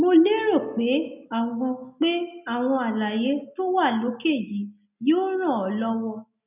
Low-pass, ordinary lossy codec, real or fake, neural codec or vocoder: 3.6 kHz; none; real; none